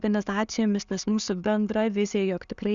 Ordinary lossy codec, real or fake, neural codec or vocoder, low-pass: Opus, 64 kbps; real; none; 7.2 kHz